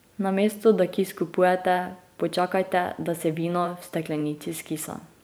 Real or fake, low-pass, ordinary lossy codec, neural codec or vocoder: fake; none; none; vocoder, 44.1 kHz, 128 mel bands every 512 samples, BigVGAN v2